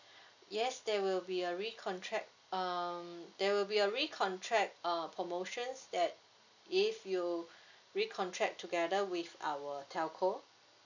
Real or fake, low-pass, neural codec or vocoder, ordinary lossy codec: real; 7.2 kHz; none; none